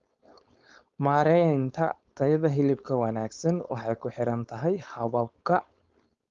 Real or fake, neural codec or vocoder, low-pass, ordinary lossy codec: fake; codec, 16 kHz, 4.8 kbps, FACodec; 7.2 kHz; Opus, 32 kbps